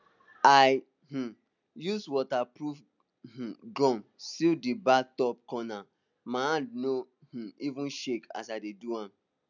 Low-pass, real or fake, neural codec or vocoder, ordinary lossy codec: 7.2 kHz; real; none; none